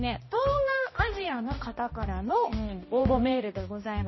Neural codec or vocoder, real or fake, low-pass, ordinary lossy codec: codec, 16 kHz, 1 kbps, X-Codec, HuBERT features, trained on balanced general audio; fake; 7.2 kHz; MP3, 24 kbps